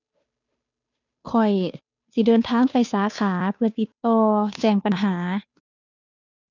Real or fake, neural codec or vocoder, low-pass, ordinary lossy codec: fake; codec, 16 kHz, 2 kbps, FunCodec, trained on Chinese and English, 25 frames a second; 7.2 kHz; none